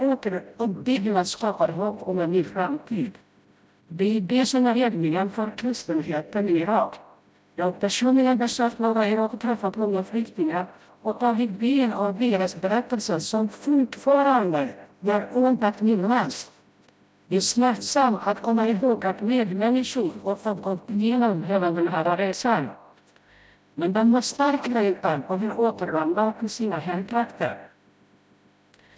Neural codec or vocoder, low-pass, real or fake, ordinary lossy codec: codec, 16 kHz, 0.5 kbps, FreqCodec, smaller model; none; fake; none